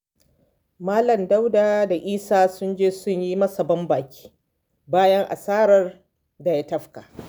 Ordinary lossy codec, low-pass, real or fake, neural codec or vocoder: none; none; real; none